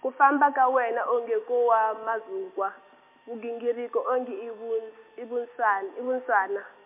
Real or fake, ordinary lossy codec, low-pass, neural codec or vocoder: real; MP3, 32 kbps; 3.6 kHz; none